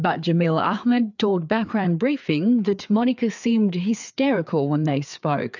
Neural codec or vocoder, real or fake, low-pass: codec, 16 kHz, 4 kbps, FreqCodec, larger model; fake; 7.2 kHz